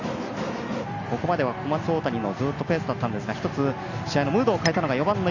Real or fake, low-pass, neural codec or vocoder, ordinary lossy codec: real; 7.2 kHz; none; none